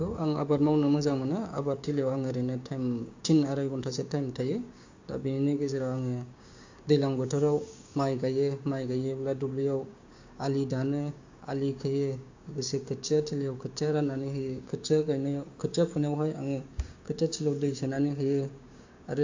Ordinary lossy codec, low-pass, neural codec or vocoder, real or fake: AAC, 48 kbps; 7.2 kHz; codec, 16 kHz, 6 kbps, DAC; fake